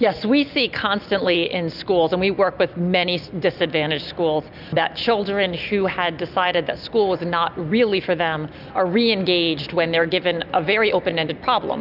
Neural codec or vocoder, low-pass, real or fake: none; 5.4 kHz; real